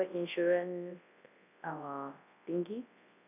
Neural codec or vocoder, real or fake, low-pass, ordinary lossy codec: codec, 24 kHz, 0.9 kbps, WavTokenizer, large speech release; fake; 3.6 kHz; none